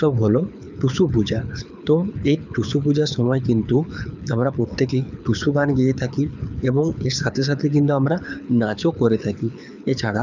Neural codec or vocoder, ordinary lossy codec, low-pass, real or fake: codec, 24 kHz, 6 kbps, HILCodec; none; 7.2 kHz; fake